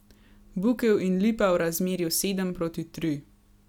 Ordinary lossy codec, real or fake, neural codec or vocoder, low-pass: none; real; none; 19.8 kHz